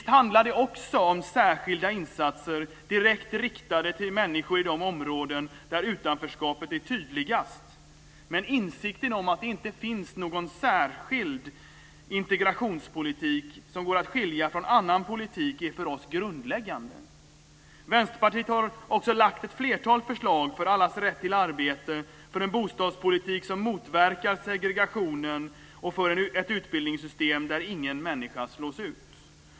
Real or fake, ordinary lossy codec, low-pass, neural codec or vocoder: real; none; none; none